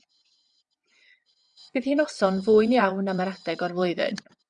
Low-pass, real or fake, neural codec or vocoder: 9.9 kHz; fake; vocoder, 22.05 kHz, 80 mel bands, WaveNeXt